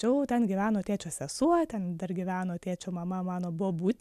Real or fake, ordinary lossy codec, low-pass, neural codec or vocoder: real; MP3, 96 kbps; 14.4 kHz; none